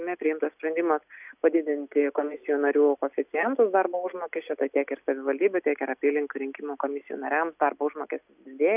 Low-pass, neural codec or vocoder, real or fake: 3.6 kHz; none; real